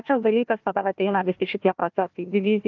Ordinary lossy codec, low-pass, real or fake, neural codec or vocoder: Opus, 24 kbps; 7.2 kHz; fake; codec, 16 kHz, 1 kbps, FunCodec, trained on Chinese and English, 50 frames a second